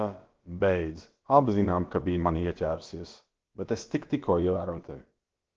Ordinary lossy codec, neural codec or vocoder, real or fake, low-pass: Opus, 16 kbps; codec, 16 kHz, about 1 kbps, DyCAST, with the encoder's durations; fake; 7.2 kHz